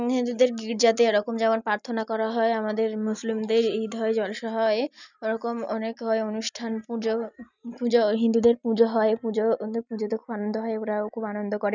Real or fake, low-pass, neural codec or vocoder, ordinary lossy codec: real; 7.2 kHz; none; none